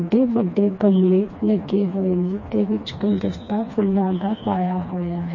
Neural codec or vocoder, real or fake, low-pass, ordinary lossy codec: codec, 16 kHz, 2 kbps, FreqCodec, smaller model; fake; 7.2 kHz; MP3, 32 kbps